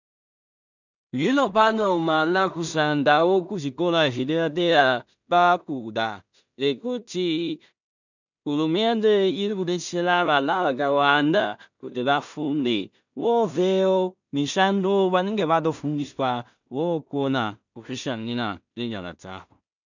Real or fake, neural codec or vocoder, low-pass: fake; codec, 16 kHz in and 24 kHz out, 0.4 kbps, LongCat-Audio-Codec, two codebook decoder; 7.2 kHz